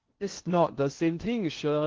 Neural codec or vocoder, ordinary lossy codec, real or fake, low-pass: codec, 16 kHz in and 24 kHz out, 0.6 kbps, FocalCodec, streaming, 2048 codes; Opus, 16 kbps; fake; 7.2 kHz